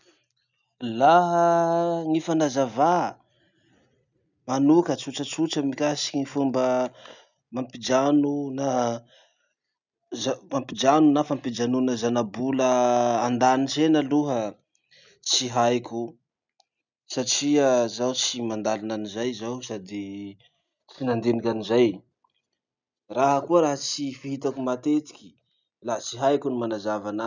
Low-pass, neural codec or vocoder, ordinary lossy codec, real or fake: 7.2 kHz; none; none; real